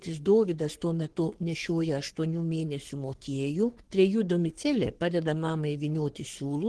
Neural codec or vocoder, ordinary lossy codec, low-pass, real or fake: codec, 44.1 kHz, 3.4 kbps, Pupu-Codec; Opus, 16 kbps; 10.8 kHz; fake